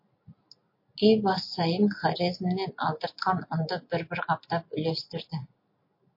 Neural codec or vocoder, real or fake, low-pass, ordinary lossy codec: none; real; 5.4 kHz; MP3, 32 kbps